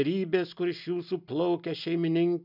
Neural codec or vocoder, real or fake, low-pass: none; real; 5.4 kHz